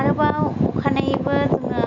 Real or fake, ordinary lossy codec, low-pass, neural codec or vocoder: real; none; 7.2 kHz; none